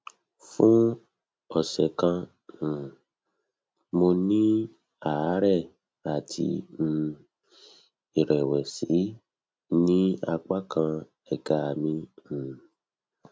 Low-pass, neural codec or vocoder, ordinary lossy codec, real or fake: none; none; none; real